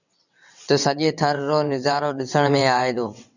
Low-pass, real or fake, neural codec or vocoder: 7.2 kHz; fake; vocoder, 22.05 kHz, 80 mel bands, WaveNeXt